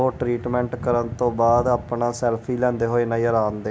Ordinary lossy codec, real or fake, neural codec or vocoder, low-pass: none; real; none; none